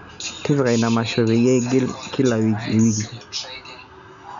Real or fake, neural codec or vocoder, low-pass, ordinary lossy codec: real; none; 7.2 kHz; none